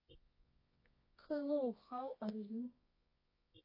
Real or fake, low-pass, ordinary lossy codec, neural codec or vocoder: fake; 5.4 kHz; MP3, 32 kbps; codec, 24 kHz, 0.9 kbps, WavTokenizer, medium music audio release